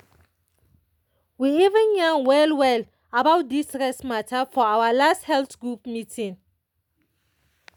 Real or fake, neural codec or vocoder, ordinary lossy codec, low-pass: real; none; none; 19.8 kHz